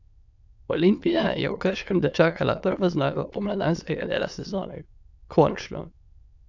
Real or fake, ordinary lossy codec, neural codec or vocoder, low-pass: fake; none; autoencoder, 22.05 kHz, a latent of 192 numbers a frame, VITS, trained on many speakers; 7.2 kHz